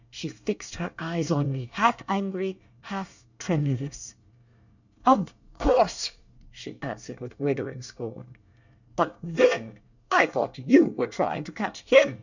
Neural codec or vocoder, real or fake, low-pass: codec, 24 kHz, 1 kbps, SNAC; fake; 7.2 kHz